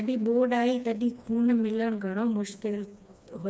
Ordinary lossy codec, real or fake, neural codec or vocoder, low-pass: none; fake; codec, 16 kHz, 2 kbps, FreqCodec, smaller model; none